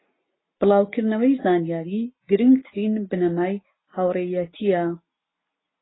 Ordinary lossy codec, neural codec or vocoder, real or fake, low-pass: AAC, 16 kbps; none; real; 7.2 kHz